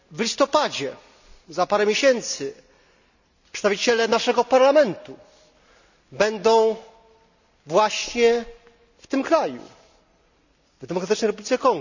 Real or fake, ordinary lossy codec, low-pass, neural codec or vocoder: real; none; 7.2 kHz; none